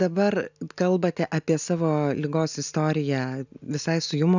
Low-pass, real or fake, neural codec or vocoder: 7.2 kHz; real; none